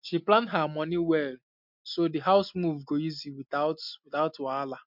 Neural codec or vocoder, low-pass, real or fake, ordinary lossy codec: none; 5.4 kHz; real; MP3, 48 kbps